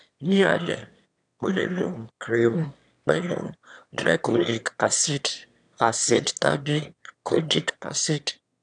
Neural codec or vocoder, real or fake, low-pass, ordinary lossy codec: autoencoder, 22.05 kHz, a latent of 192 numbers a frame, VITS, trained on one speaker; fake; 9.9 kHz; none